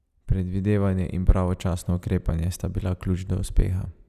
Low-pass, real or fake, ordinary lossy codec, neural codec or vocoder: 14.4 kHz; real; none; none